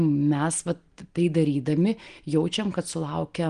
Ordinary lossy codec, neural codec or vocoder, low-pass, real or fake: Opus, 24 kbps; none; 9.9 kHz; real